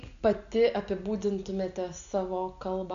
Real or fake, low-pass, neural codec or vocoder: real; 7.2 kHz; none